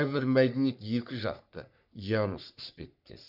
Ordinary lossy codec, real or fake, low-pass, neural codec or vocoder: none; fake; 5.4 kHz; codec, 44.1 kHz, 3.4 kbps, Pupu-Codec